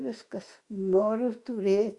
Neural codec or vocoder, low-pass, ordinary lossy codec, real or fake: autoencoder, 48 kHz, 32 numbers a frame, DAC-VAE, trained on Japanese speech; 10.8 kHz; Opus, 64 kbps; fake